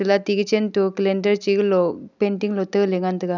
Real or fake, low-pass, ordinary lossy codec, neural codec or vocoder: real; 7.2 kHz; none; none